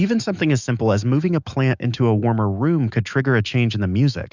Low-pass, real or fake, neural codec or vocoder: 7.2 kHz; fake; autoencoder, 48 kHz, 128 numbers a frame, DAC-VAE, trained on Japanese speech